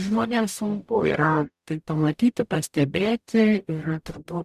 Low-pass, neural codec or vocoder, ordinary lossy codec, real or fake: 14.4 kHz; codec, 44.1 kHz, 0.9 kbps, DAC; Opus, 64 kbps; fake